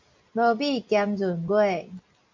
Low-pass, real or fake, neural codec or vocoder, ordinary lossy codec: 7.2 kHz; real; none; MP3, 48 kbps